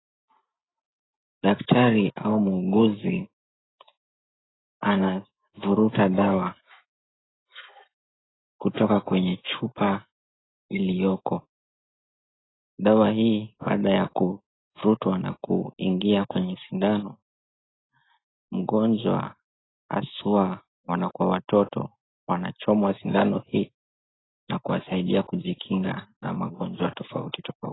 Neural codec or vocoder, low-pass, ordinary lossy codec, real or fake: none; 7.2 kHz; AAC, 16 kbps; real